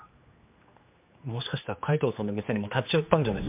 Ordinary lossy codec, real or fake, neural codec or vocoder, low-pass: MP3, 32 kbps; fake; codec, 16 kHz, 2 kbps, X-Codec, HuBERT features, trained on general audio; 3.6 kHz